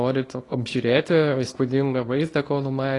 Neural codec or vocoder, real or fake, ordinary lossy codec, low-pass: codec, 24 kHz, 0.9 kbps, WavTokenizer, small release; fake; AAC, 32 kbps; 10.8 kHz